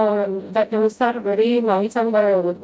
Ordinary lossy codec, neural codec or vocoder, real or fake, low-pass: none; codec, 16 kHz, 0.5 kbps, FreqCodec, smaller model; fake; none